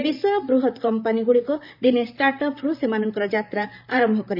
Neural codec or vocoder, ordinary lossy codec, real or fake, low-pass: vocoder, 44.1 kHz, 128 mel bands, Pupu-Vocoder; none; fake; 5.4 kHz